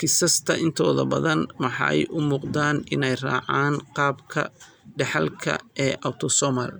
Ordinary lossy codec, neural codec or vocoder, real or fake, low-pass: none; none; real; none